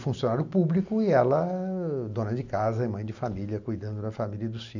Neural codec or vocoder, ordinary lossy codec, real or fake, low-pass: none; none; real; 7.2 kHz